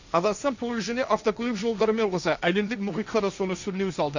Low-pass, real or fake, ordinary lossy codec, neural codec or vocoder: 7.2 kHz; fake; none; codec, 16 kHz, 1.1 kbps, Voila-Tokenizer